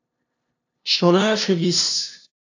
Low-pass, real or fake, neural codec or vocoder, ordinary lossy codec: 7.2 kHz; fake; codec, 16 kHz, 0.5 kbps, FunCodec, trained on LibriTTS, 25 frames a second; AAC, 48 kbps